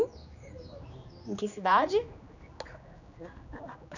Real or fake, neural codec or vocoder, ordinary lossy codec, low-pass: fake; codec, 16 kHz, 2 kbps, X-Codec, HuBERT features, trained on general audio; none; 7.2 kHz